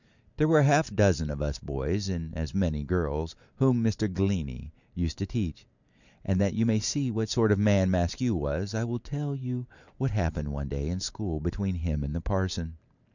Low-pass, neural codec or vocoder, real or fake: 7.2 kHz; vocoder, 44.1 kHz, 128 mel bands every 512 samples, BigVGAN v2; fake